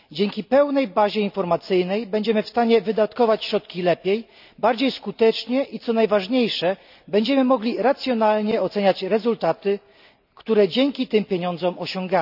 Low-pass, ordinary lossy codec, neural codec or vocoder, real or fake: 5.4 kHz; none; none; real